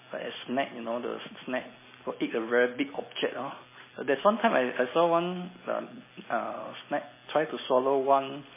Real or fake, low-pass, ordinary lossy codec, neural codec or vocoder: real; 3.6 kHz; MP3, 16 kbps; none